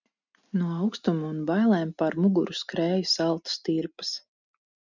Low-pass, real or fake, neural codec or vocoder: 7.2 kHz; real; none